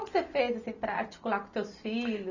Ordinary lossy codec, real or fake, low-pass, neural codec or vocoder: none; real; 7.2 kHz; none